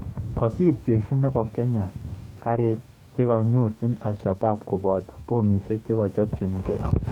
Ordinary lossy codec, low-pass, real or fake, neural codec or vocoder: none; 19.8 kHz; fake; codec, 44.1 kHz, 2.6 kbps, DAC